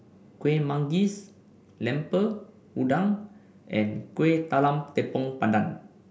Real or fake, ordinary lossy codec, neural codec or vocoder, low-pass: real; none; none; none